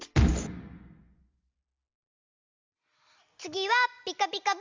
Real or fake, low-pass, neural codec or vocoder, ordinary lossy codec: real; 7.2 kHz; none; Opus, 32 kbps